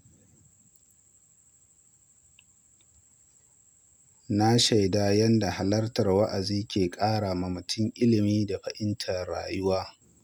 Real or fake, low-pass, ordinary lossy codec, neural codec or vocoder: real; none; none; none